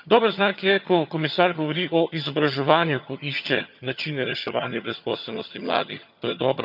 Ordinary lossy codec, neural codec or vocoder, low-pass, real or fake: none; vocoder, 22.05 kHz, 80 mel bands, HiFi-GAN; 5.4 kHz; fake